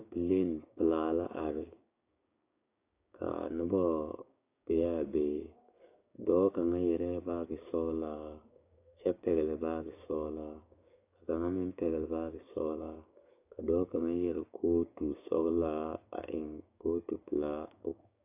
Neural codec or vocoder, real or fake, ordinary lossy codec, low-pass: codec, 16 kHz, 6 kbps, DAC; fake; AAC, 24 kbps; 3.6 kHz